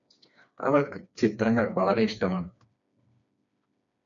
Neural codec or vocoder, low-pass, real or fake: codec, 16 kHz, 2 kbps, FreqCodec, smaller model; 7.2 kHz; fake